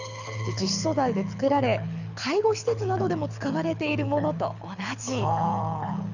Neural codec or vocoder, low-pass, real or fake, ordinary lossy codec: codec, 24 kHz, 6 kbps, HILCodec; 7.2 kHz; fake; none